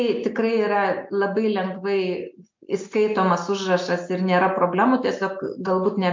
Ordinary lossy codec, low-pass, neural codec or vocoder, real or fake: MP3, 48 kbps; 7.2 kHz; none; real